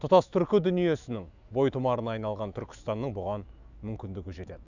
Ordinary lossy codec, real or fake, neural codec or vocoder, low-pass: none; fake; autoencoder, 48 kHz, 128 numbers a frame, DAC-VAE, trained on Japanese speech; 7.2 kHz